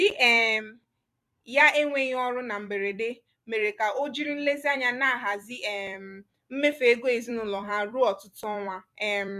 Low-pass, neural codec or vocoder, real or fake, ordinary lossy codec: 14.4 kHz; vocoder, 44.1 kHz, 128 mel bands every 256 samples, BigVGAN v2; fake; MP3, 96 kbps